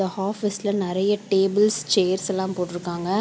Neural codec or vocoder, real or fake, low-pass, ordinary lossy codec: none; real; none; none